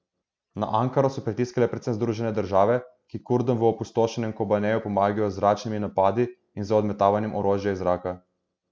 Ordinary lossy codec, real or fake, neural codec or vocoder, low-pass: none; real; none; none